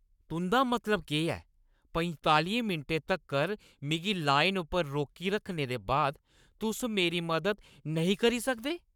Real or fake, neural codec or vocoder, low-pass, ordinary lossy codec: fake; codec, 44.1 kHz, 7.8 kbps, Pupu-Codec; 19.8 kHz; none